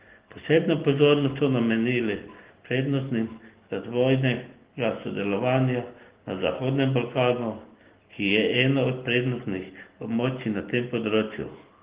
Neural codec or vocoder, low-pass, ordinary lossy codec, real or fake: none; 3.6 kHz; Opus, 16 kbps; real